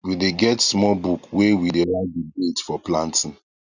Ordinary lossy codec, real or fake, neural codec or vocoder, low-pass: none; real; none; 7.2 kHz